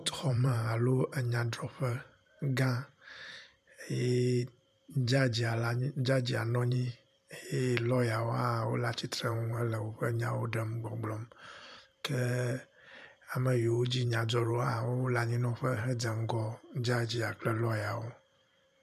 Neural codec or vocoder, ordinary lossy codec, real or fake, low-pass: vocoder, 44.1 kHz, 128 mel bands every 512 samples, BigVGAN v2; MP3, 96 kbps; fake; 14.4 kHz